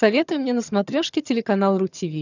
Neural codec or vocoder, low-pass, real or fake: vocoder, 22.05 kHz, 80 mel bands, HiFi-GAN; 7.2 kHz; fake